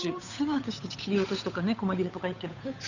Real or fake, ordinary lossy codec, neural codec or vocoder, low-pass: fake; none; codec, 16 kHz, 8 kbps, FunCodec, trained on Chinese and English, 25 frames a second; 7.2 kHz